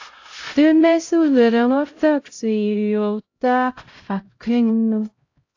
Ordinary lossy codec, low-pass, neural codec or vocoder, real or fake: AAC, 48 kbps; 7.2 kHz; codec, 16 kHz, 0.5 kbps, X-Codec, HuBERT features, trained on LibriSpeech; fake